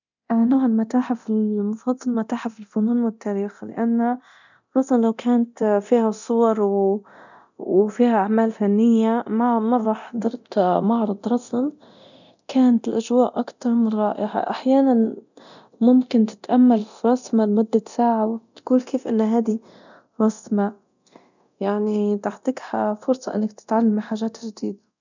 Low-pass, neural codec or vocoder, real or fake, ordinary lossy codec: 7.2 kHz; codec, 24 kHz, 0.9 kbps, DualCodec; fake; none